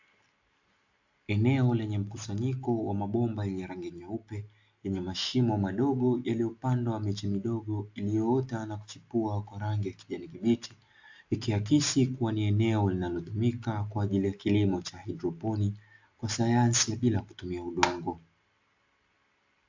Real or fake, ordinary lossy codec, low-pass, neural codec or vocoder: real; AAC, 48 kbps; 7.2 kHz; none